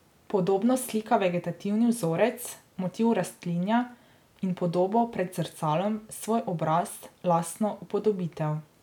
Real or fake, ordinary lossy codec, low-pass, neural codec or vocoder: real; none; 19.8 kHz; none